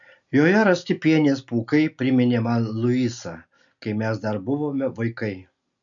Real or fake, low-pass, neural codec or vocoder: real; 7.2 kHz; none